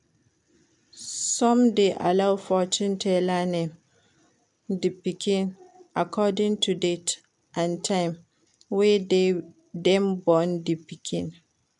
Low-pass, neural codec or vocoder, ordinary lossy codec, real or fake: 10.8 kHz; none; MP3, 96 kbps; real